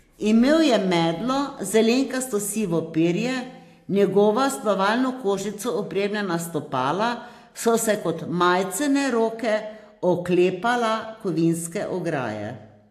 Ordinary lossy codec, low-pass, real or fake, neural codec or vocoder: AAC, 64 kbps; 14.4 kHz; real; none